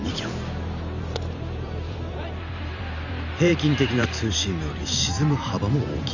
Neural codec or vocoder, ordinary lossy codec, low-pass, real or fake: vocoder, 44.1 kHz, 128 mel bands every 512 samples, BigVGAN v2; none; 7.2 kHz; fake